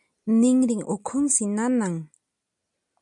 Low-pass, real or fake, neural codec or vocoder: 10.8 kHz; real; none